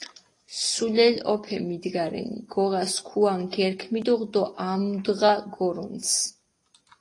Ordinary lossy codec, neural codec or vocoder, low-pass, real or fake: AAC, 32 kbps; none; 10.8 kHz; real